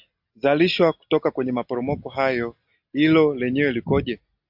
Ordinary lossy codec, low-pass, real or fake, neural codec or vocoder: AAC, 48 kbps; 5.4 kHz; real; none